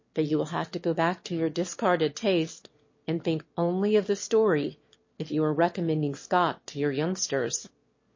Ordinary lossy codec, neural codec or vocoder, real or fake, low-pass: MP3, 32 kbps; autoencoder, 22.05 kHz, a latent of 192 numbers a frame, VITS, trained on one speaker; fake; 7.2 kHz